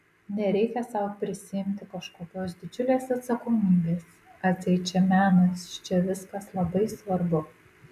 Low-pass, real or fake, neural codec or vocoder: 14.4 kHz; real; none